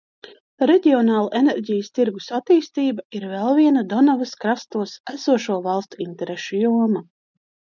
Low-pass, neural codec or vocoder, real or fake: 7.2 kHz; none; real